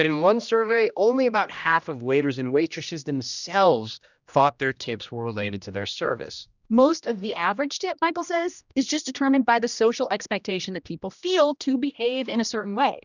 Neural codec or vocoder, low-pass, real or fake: codec, 16 kHz, 1 kbps, X-Codec, HuBERT features, trained on general audio; 7.2 kHz; fake